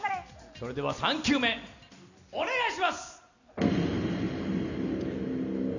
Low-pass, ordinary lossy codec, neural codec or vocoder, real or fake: 7.2 kHz; none; none; real